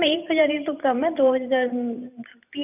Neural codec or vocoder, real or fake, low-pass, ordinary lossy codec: vocoder, 44.1 kHz, 128 mel bands every 512 samples, BigVGAN v2; fake; 3.6 kHz; none